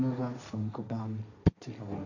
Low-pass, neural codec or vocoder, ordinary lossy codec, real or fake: 7.2 kHz; codec, 16 kHz, 1.1 kbps, Voila-Tokenizer; none; fake